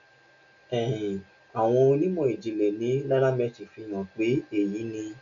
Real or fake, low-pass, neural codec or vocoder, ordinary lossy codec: real; 7.2 kHz; none; none